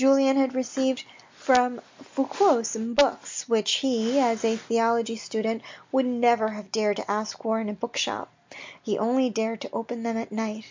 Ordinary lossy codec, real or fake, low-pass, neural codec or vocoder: MP3, 64 kbps; real; 7.2 kHz; none